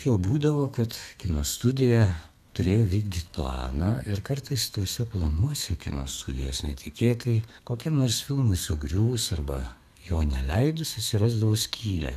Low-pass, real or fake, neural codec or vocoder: 14.4 kHz; fake; codec, 32 kHz, 1.9 kbps, SNAC